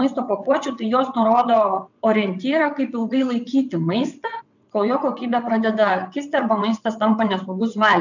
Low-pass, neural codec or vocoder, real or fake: 7.2 kHz; vocoder, 22.05 kHz, 80 mel bands, WaveNeXt; fake